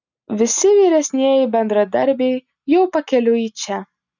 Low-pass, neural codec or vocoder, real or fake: 7.2 kHz; none; real